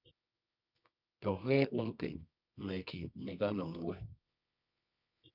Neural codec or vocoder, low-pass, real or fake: codec, 24 kHz, 0.9 kbps, WavTokenizer, medium music audio release; 5.4 kHz; fake